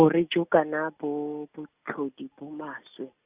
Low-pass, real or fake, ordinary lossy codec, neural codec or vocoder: 3.6 kHz; real; Opus, 64 kbps; none